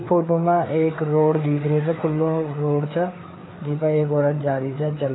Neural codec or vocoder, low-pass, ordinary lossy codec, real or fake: codec, 16 kHz, 4 kbps, FreqCodec, larger model; 7.2 kHz; AAC, 16 kbps; fake